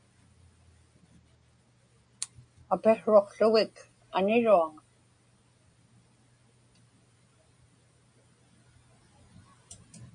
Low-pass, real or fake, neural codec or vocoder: 9.9 kHz; real; none